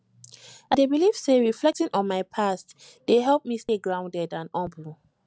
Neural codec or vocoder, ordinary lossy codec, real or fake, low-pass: none; none; real; none